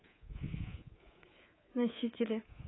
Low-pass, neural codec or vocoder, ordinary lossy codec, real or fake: 7.2 kHz; codec, 24 kHz, 3.1 kbps, DualCodec; AAC, 16 kbps; fake